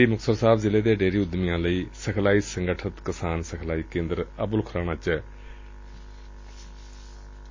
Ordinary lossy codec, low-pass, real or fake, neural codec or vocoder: MP3, 32 kbps; 7.2 kHz; real; none